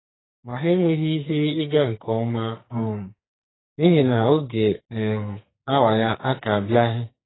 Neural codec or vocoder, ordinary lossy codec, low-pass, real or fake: codec, 44.1 kHz, 2.6 kbps, SNAC; AAC, 16 kbps; 7.2 kHz; fake